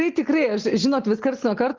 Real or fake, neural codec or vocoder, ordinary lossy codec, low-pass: real; none; Opus, 32 kbps; 7.2 kHz